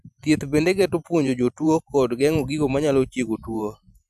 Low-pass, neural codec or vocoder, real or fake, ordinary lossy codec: 14.4 kHz; vocoder, 44.1 kHz, 128 mel bands every 512 samples, BigVGAN v2; fake; none